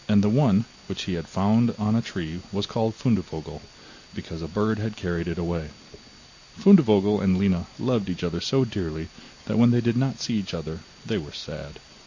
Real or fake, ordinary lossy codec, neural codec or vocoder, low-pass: real; AAC, 48 kbps; none; 7.2 kHz